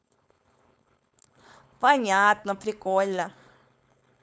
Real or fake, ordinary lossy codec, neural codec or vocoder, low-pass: fake; none; codec, 16 kHz, 4.8 kbps, FACodec; none